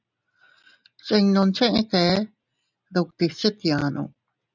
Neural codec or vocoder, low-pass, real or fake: none; 7.2 kHz; real